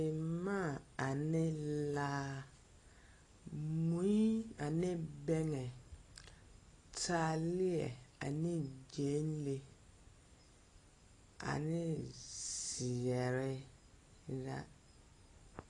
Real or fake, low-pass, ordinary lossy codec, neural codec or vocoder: real; 10.8 kHz; AAC, 32 kbps; none